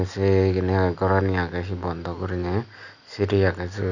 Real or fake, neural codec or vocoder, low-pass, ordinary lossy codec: real; none; 7.2 kHz; none